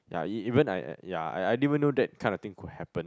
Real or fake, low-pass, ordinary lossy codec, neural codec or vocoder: real; none; none; none